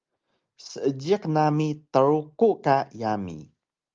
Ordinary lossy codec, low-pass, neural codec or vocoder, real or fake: Opus, 32 kbps; 7.2 kHz; none; real